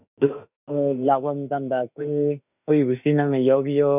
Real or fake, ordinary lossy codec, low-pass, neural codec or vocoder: fake; none; 3.6 kHz; autoencoder, 48 kHz, 32 numbers a frame, DAC-VAE, trained on Japanese speech